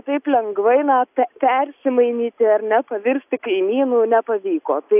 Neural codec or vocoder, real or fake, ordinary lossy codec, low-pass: none; real; AAC, 32 kbps; 3.6 kHz